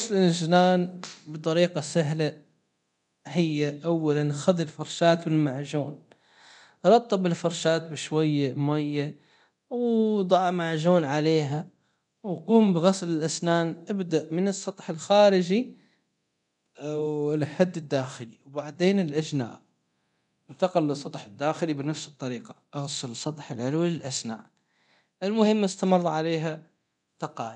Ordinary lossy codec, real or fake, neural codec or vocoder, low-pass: none; fake; codec, 24 kHz, 0.9 kbps, DualCodec; 10.8 kHz